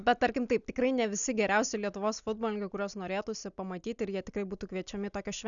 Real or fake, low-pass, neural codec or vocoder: real; 7.2 kHz; none